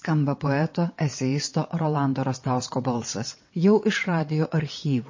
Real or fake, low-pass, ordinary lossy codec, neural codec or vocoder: fake; 7.2 kHz; MP3, 32 kbps; vocoder, 22.05 kHz, 80 mel bands, WaveNeXt